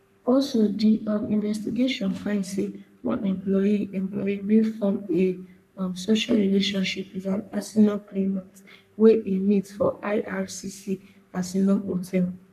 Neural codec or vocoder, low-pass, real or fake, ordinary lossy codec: codec, 44.1 kHz, 3.4 kbps, Pupu-Codec; 14.4 kHz; fake; none